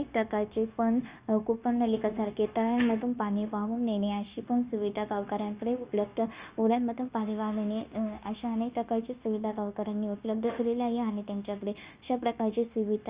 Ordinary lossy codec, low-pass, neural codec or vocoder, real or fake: Opus, 64 kbps; 3.6 kHz; codec, 16 kHz, 0.9 kbps, LongCat-Audio-Codec; fake